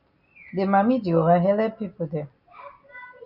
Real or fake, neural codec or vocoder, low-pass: real; none; 5.4 kHz